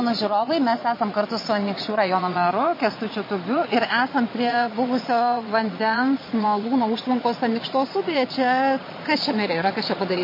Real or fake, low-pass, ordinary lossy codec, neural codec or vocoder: fake; 5.4 kHz; MP3, 24 kbps; vocoder, 22.05 kHz, 80 mel bands, Vocos